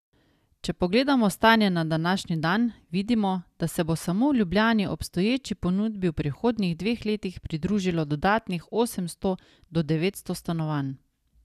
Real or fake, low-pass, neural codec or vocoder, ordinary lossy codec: real; 14.4 kHz; none; none